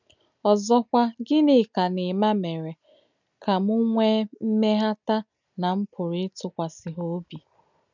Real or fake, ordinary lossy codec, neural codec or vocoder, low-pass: real; none; none; 7.2 kHz